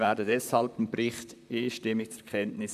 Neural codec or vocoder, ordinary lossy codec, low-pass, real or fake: vocoder, 44.1 kHz, 128 mel bands, Pupu-Vocoder; none; 14.4 kHz; fake